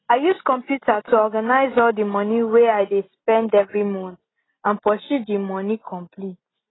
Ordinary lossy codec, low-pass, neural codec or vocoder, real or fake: AAC, 16 kbps; 7.2 kHz; none; real